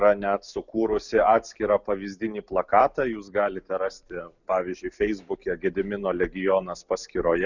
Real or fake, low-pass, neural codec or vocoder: real; 7.2 kHz; none